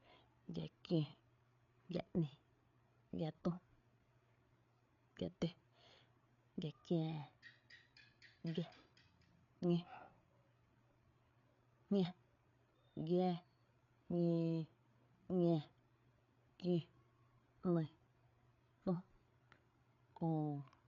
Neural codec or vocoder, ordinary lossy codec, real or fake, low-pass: codec, 16 kHz, 8 kbps, FreqCodec, larger model; none; fake; 7.2 kHz